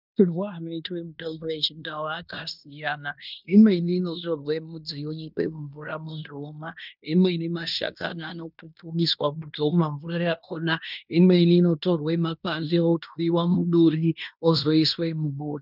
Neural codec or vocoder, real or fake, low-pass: codec, 16 kHz in and 24 kHz out, 0.9 kbps, LongCat-Audio-Codec, fine tuned four codebook decoder; fake; 5.4 kHz